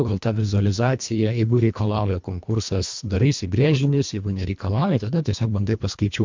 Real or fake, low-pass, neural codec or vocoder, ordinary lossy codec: fake; 7.2 kHz; codec, 24 kHz, 1.5 kbps, HILCodec; MP3, 64 kbps